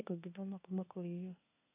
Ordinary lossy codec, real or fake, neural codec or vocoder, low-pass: none; fake; codec, 24 kHz, 1 kbps, SNAC; 3.6 kHz